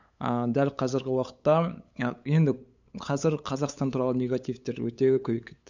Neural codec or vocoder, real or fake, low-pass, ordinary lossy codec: codec, 16 kHz, 8 kbps, FunCodec, trained on LibriTTS, 25 frames a second; fake; 7.2 kHz; none